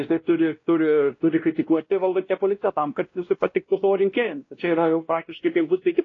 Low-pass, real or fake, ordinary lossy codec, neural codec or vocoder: 7.2 kHz; fake; AAC, 32 kbps; codec, 16 kHz, 1 kbps, X-Codec, WavLM features, trained on Multilingual LibriSpeech